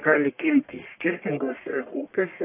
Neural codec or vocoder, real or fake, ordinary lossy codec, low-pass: codec, 44.1 kHz, 1.7 kbps, Pupu-Codec; fake; AAC, 24 kbps; 3.6 kHz